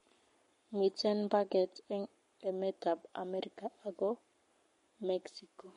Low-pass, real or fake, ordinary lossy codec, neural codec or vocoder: 19.8 kHz; fake; MP3, 48 kbps; codec, 44.1 kHz, 7.8 kbps, Pupu-Codec